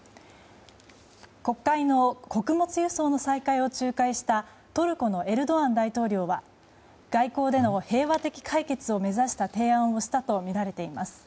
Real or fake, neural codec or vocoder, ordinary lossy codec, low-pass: real; none; none; none